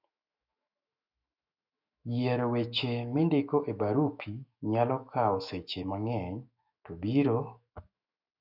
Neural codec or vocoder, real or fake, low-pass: autoencoder, 48 kHz, 128 numbers a frame, DAC-VAE, trained on Japanese speech; fake; 5.4 kHz